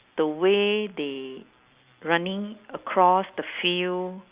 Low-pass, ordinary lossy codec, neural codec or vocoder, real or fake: 3.6 kHz; Opus, 64 kbps; none; real